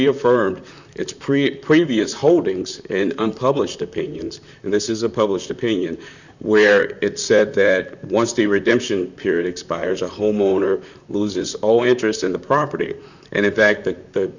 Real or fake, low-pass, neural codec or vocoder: fake; 7.2 kHz; vocoder, 44.1 kHz, 128 mel bands, Pupu-Vocoder